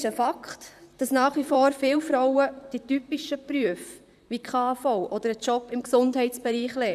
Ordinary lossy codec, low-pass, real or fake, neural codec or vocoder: none; 14.4 kHz; fake; vocoder, 44.1 kHz, 128 mel bands, Pupu-Vocoder